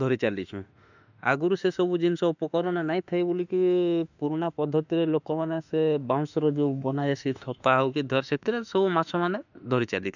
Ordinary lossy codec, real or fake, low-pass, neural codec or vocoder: none; fake; 7.2 kHz; autoencoder, 48 kHz, 32 numbers a frame, DAC-VAE, trained on Japanese speech